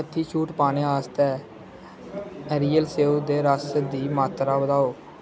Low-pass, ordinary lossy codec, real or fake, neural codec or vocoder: none; none; real; none